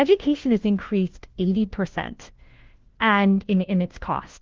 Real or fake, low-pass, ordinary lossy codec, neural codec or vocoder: fake; 7.2 kHz; Opus, 16 kbps; codec, 16 kHz, 1 kbps, FunCodec, trained on LibriTTS, 50 frames a second